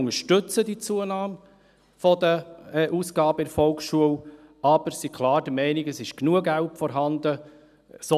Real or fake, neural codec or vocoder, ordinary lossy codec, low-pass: real; none; none; 14.4 kHz